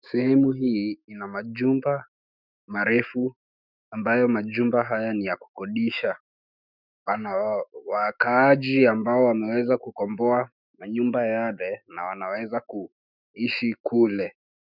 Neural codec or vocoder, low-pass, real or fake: autoencoder, 48 kHz, 128 numbers a frame, DAC-VAE, trained on Japanese speech; 5.4 kHz; fake